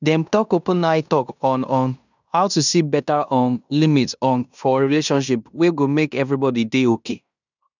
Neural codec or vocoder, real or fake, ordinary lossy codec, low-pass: codec, 16 kHz in and 24 kHz out, 0.9 kbps, LongCat-Audio-Codec, fine tuned four codebook decoder; fake; none; 7.2 kHz